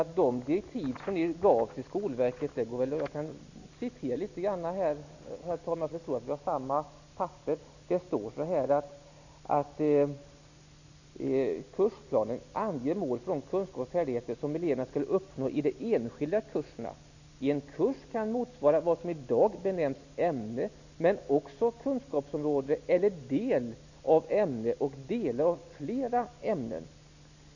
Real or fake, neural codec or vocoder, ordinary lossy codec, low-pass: real; none; none; 7.2 kHz